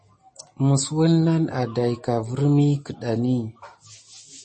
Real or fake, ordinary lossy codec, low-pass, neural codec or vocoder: real; MP3, 32 kbps; 10.8 kHz; none